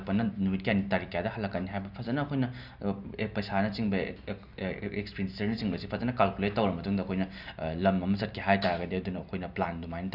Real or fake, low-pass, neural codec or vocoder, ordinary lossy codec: real; 5.4 kHz; none; none